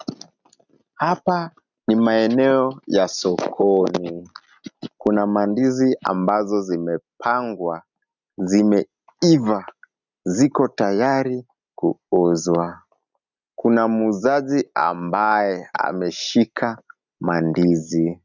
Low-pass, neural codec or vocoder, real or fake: 7.2 kHz; none; real